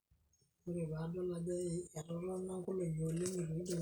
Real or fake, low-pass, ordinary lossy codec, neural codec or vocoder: real; none; none; none